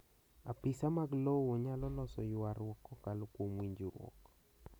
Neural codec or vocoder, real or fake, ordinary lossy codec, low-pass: none; real; none; none